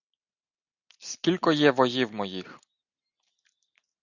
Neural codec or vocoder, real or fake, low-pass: none; real; 7.2 kHz